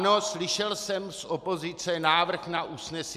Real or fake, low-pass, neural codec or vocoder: real; 14.4 kHz; none